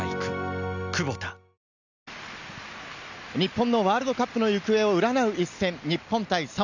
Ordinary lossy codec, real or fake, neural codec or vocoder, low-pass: none; real; none; 7.2 kHz